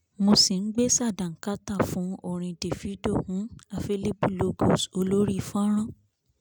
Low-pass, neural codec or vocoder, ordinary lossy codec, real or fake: none; none; none; real